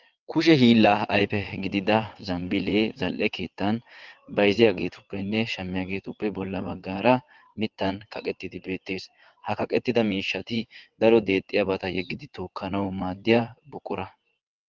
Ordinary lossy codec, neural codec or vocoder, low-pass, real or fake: Opus, 32 kbps; vocoder, 22.05 kHz, 80 mel bands, WaveNeXt; 7.2 kHz; fake